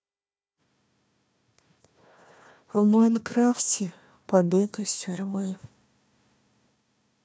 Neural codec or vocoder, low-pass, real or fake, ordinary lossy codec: codec, 16 kHz, 1 kbps, FunCodec, trained on Chinese and English, 50 frames a second; none; fake; none